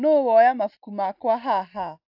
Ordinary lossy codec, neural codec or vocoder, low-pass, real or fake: AAC, 48 kbps; none; 5.4 kHz; real